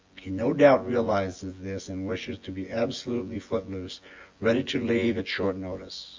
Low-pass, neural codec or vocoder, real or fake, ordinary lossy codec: 7.2 kHz; vocoder, 24 kHz, 100 mel bands, Vocos; fake; Opus, 32 kbps